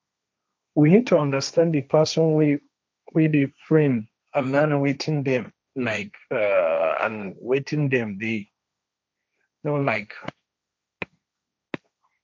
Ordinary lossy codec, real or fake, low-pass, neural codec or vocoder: none; fake; none; codec, 16 kHz, 1.1 kbps, Voila-Tokenizer